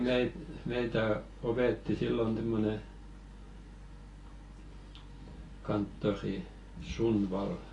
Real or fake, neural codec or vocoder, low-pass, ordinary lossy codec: real; none; 10.8 kHz; AAC, 32 kbps